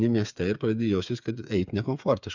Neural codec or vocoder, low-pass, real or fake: codec, 16 kHz, 8 kbps, FreqCodec, smaller model; 7.2 kHz; fake